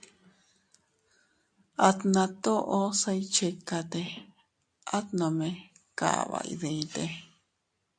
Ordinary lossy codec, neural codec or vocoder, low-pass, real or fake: AAC, 64 kbps; none; 9.9 kHz; real